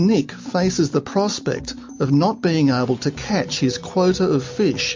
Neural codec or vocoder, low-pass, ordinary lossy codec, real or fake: none; 7.2 kHz; MP3, 48 kbps; real